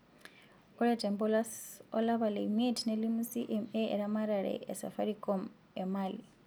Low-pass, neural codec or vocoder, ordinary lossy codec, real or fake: none; none; none; real